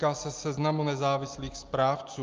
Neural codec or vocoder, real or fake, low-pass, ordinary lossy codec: none; real; 7.2 kHz; Opus, 32 kbps